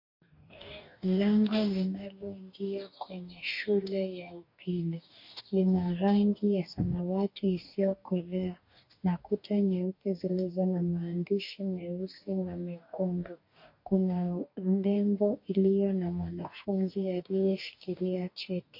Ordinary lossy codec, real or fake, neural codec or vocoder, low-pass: MP3, 32 kbps; fake; codec, 44.1 kHz, 2.6 kbps, DAC; 5.4 kHz